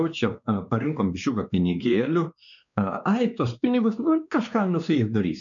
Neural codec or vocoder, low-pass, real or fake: codec, 16 kHz, 2 kbps, X-Codec, WavLM features, trained on Multilingual LibriSpeech; 7.2 kHz; fake